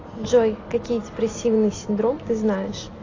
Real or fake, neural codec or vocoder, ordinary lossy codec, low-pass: real; none; AAC, 32 kbps; 7.2 kHz